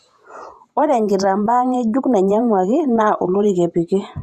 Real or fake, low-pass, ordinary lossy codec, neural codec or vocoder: fake; 14.4 kHz; Opus, 64 kbps; vocoder, 48 kHz, 128 mel bands, Vocos